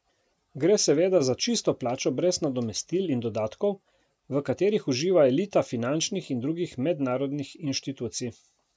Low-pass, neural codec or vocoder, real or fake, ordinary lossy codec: none; none; real; none